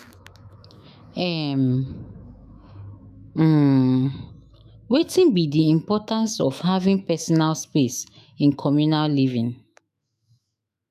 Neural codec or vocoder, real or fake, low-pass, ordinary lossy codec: autoencoder, 48 kHz, 128 numbers a frame, DAC-VAE, trained on Japanese speech; fake; 14.4 kHz; none